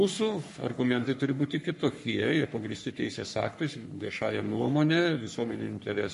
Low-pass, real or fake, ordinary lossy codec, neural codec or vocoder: 14.4 kHz; fake; MP3, 48 kbps; codec, 44.1 kHz, 3.4 kbps, Pupu-Codec